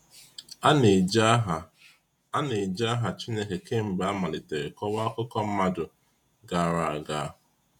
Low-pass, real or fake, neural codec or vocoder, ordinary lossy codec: 19.8 kHz; fake; vocoder, 48 kHz, 128 mel bands, Vocos; none